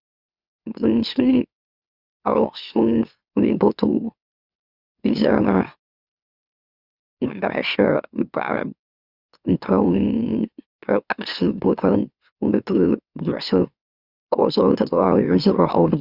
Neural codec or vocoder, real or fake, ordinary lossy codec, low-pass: autoencoder, 44.1 kHz, a latent of 192 numbers a frame, MeloTTS; fake; Opus, 64 kbps; 5.4 kHz